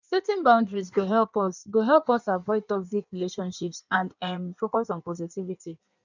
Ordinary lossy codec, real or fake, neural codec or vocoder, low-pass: none; fake; codec, 16 kHz in and 24 kHz out, 1.1 kbps, FireRedTTS-2 codec; 7.2 kHz